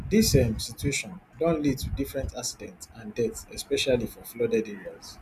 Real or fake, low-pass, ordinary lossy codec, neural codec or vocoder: real; 14.4 kHz; none; none